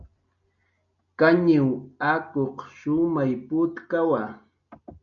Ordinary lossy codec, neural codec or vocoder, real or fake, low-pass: MP3, 96 kbps; none; real; 7.2 kHz